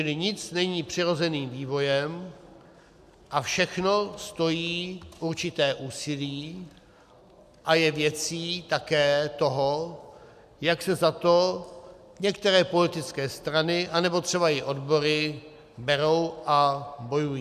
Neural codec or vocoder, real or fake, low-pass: none; real; 14.4 kHz